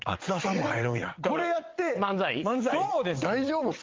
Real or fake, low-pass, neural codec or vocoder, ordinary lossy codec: fake; 7.2 kHz; vocoder, 22.05 kHz, 80 mel bands, WaveNeXt; Opus, 32 kbps